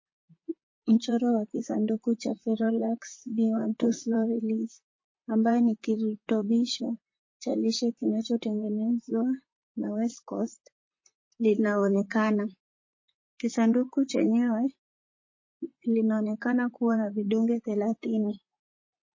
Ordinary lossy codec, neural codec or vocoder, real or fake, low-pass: MP3, 32 kbps; vocoder, 44.1 kHz, 128 mel bands, Pupu-Vocoder; fake; 7.2 kHz